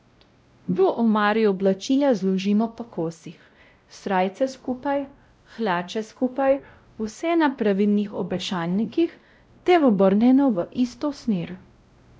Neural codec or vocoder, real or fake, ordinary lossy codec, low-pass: codec, 16 kHz, 0.5 kbps, X-Codec, WavLM features, trained on Multilingual LibriSpeech; fake; none; none